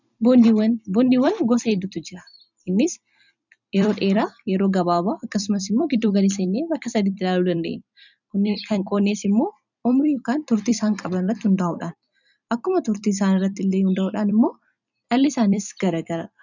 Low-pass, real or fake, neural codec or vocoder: 7.2 kHz; fake; vocoder, 44.1 kHz, 128 mel bands every 256 samples, BigVGAN v2